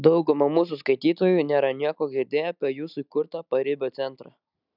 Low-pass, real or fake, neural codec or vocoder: 5.4 kHz; real; none